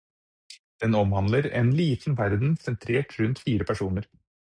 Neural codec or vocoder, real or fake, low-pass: none; real; 9.9 kHz